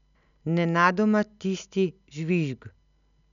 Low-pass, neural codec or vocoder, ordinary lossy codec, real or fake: 7.2 kHz; none; none; real